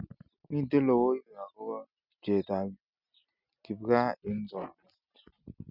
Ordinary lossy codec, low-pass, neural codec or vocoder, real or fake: none; 5.4 kHz; none; real